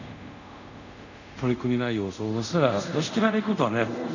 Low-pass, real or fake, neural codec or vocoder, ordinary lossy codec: 7.2 kHz; fake; codec, 24 kHz, 0.5 kbps, DualCodec; none